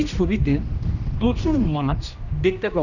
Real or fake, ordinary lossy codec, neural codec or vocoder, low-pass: fake; none; codec, 16 kHz, 1 kbps, X-Codec, HuBERT features, trained on general audio; 7.2 kHz